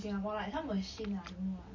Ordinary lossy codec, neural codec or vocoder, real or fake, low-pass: MP3, 32 kbps; none; real; 7.2 kHz